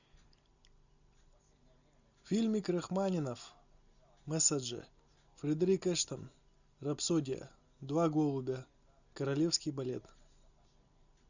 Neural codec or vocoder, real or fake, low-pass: none; real; 7.2 kHz